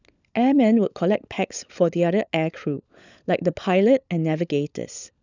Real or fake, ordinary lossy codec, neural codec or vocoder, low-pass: fake; none; codec, 16 kHz, 16 kbps, FunCodec, trained on LibriTTS, 50 frames a second; 7.2 kHz